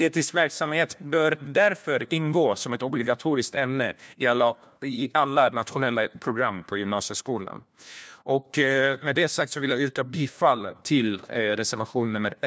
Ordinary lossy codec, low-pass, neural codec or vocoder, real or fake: none; none; codec, 16 kHz, 1 kbps, FunCodec, trained on LibriTTS, 50 frames a second; fake